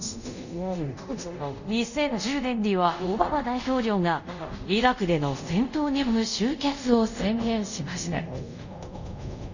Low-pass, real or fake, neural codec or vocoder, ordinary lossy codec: 7.2 kHz; fake; codec, 24 kHz, 0.5 kbps, DualCodec; none